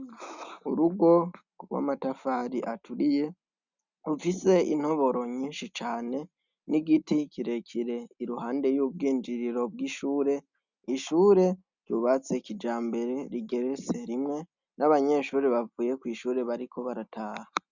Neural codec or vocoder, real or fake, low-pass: none; real; 7.2 kHz